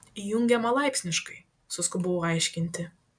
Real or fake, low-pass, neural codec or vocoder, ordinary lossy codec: real; 9.9 kHz; none; MP3, 96 kbps